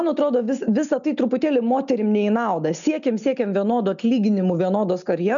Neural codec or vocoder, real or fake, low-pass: none; real; 7.2 kHz